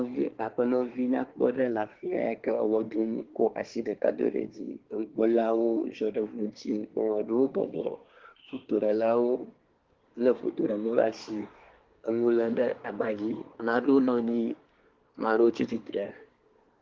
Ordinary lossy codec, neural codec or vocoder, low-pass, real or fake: Opus, 16 kbps; codec, 24 kHz, 1 kbps, SNAC; 7.2 kHz; fake